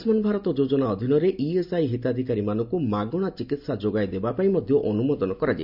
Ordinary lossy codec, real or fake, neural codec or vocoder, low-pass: none; real; none; 5.4 kHz